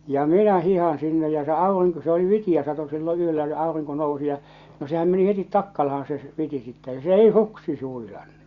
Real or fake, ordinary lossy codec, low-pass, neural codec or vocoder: real; Opus, 64 kbps; 7.2 kHz; none